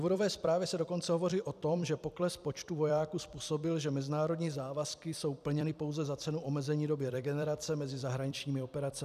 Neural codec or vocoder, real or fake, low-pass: vocoder, 44.1 kHz, 128 mel bands every 256 samples, BigVGAN v2; fake; 14.4 kHz